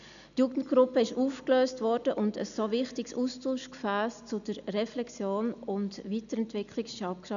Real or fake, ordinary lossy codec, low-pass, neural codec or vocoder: real; none; 7.2 kHz; none